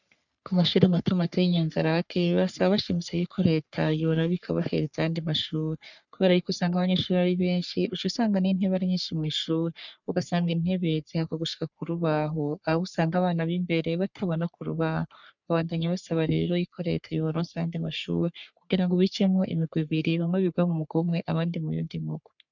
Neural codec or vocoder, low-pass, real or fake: codec, 44.1 kHz, 3.4 kbps, Pupu-Codec; 7.2 kHz; fake